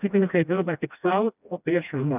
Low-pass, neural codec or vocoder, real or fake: 3.6 kHz; codec, 16 kHz, 1 kbps, FreqCodec, smaller model; fake